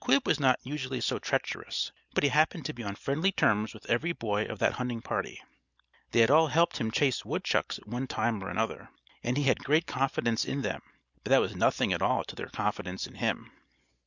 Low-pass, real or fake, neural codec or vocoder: 7.2 kHz; real; none